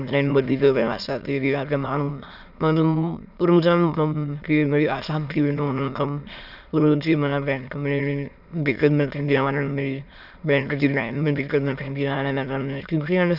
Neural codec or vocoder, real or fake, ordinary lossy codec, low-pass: autoencoder, 22.05 kHz, a latent of 192 numbers a frame, VITS, trained on many speakers; fake; none; 5.4 kHz